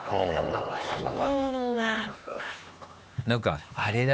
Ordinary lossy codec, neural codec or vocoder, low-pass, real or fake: none; codec, 16 kHz, 2 kbps, X-Codec, HuBERT features, trained on LibriSpeech; none; fake